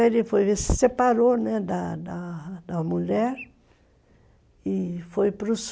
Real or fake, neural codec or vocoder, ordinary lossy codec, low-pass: real; none; none; none